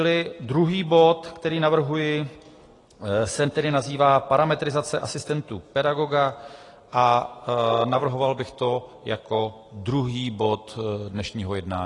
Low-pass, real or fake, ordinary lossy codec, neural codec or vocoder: 10.8 kHz; real; AAC, 32 kbps; none